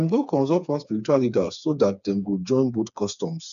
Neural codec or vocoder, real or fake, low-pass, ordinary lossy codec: codec, 16 kHz, 4 kbps, FreqCodec, smaller model; fake; 7.2 kHz; none